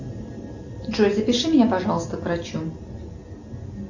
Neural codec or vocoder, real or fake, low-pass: none; real; 7.2 kHz